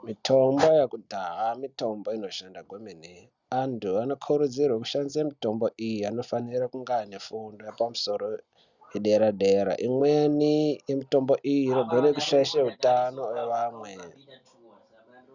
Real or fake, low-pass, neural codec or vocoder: real; 7.2 kHz; none